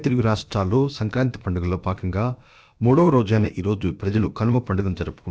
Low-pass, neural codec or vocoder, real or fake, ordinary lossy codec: none; codec, 16 kHz, about 1 kbps, DyCAST, with the encoder's durations; fake; none